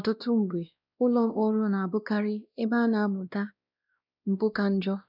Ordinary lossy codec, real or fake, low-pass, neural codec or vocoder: none; fake; 5.4 kHz; codec, 16 kHz, 1 kbps, X-Codec, WavLM features, trained on Multilingual LibriSpeech